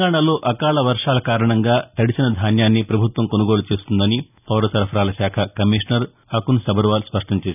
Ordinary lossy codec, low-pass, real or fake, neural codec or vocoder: none; 3.6 kHz; real; none